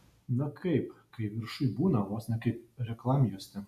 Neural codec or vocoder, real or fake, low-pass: none; real; 14.4 kHz